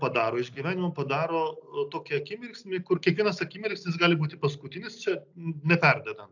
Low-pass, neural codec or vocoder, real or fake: 7.2 kHz; none; real